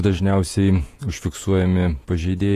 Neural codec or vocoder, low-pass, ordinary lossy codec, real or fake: vocoder, 48 kHz, 128 mel bands, Vocos; 14.4 kHz; AAC, 48 kbps; fake